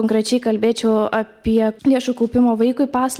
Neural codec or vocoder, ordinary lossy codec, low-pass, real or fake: none; Opus, 24 kbps; 19.8 kHz; real